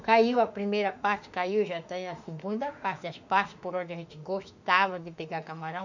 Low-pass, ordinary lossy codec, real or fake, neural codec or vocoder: 7.2 kHz; none; fake; autoencoder, 48 kHz, 32 numbers a frame, DAC-VAE, trained on Japanese speech